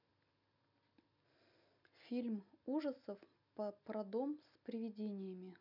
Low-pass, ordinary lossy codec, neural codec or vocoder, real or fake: 5.4 kHz; none; none; real